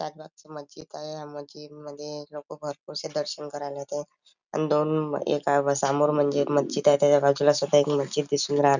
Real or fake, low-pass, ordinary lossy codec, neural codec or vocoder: real; 7.2 kHz; none; none